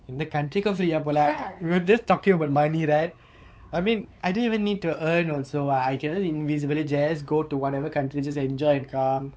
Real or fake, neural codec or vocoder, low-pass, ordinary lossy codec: fake; codec, 16 kHz, 4 kbps, X-Codec, WavLM features, trained on Multilingual LibriSpeech; none; none